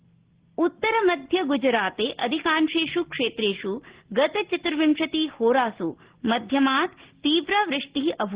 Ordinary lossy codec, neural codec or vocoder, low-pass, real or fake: Opus, 16 kbps; none; 3.6 kHz; real